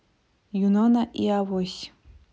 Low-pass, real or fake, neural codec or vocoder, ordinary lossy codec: none; real; none; none